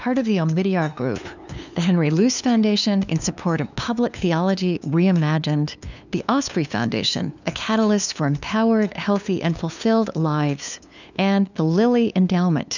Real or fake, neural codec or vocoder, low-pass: fake; codec, 16 kHz, 2 kbps, FunCodec, trained on LibriTTS, 25 frames a second; 7.2 kHz